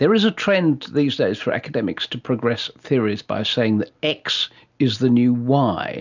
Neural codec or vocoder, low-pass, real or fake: none; 7.2 kHz; real